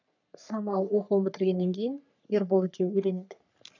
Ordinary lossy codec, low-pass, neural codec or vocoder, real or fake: none; 7.2 kHz; codec, 44.1 kHz, 3.4 kbps, Pupu-Codec; fake